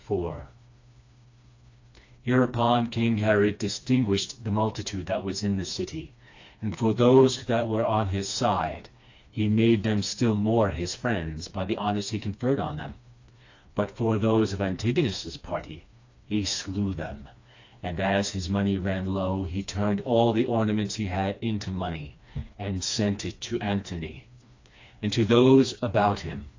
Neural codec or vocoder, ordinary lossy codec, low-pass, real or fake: codec, 16 kHz, 2 kbps, FreqCodec, smaller model; AAC, 48 kbps; 7.2 kHz; fake